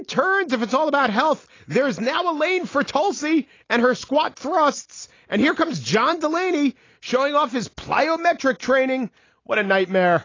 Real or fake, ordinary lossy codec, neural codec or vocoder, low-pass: real; AAC, 32 kbps; none; 7.2 kHz